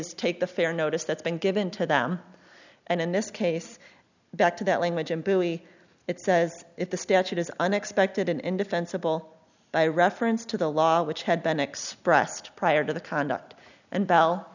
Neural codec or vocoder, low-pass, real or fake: none; 7.2 kHz; real